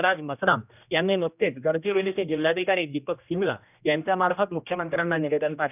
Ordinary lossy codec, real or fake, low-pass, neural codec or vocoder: none; fake; 3.6 kHz; codec, 16 kHz, 1 kbps, X-Codec, HuBERT features, trained on general audio